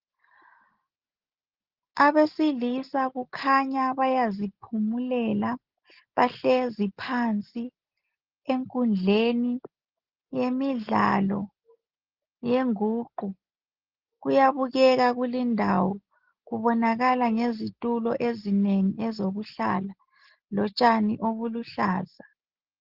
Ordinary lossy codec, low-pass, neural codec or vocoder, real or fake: Opus, 16 kbps; 5.4 kHz; none; real